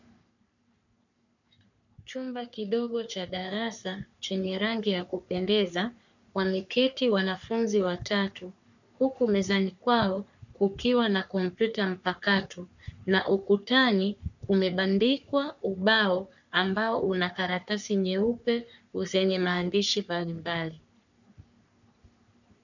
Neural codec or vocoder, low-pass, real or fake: codec, 44.1 kHz, 3.4 kbps, Pupu-Codec; 7.2 kHz; fake